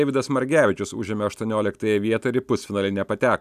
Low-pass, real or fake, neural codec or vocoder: 14.4 kHz; real; none